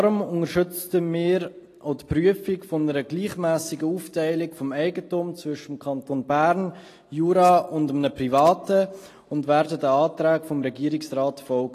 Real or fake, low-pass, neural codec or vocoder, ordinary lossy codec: real; 14.4 kHz; none; AAC, 48 kbps